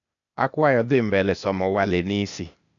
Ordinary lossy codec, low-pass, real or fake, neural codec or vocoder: none; 7.2 kHz; fake; codec, 16 kHz, 0.8 kbps, ZipCodec